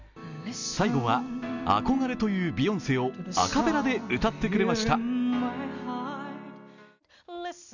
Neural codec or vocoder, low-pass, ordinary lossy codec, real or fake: none; 7.2 kHz; none; real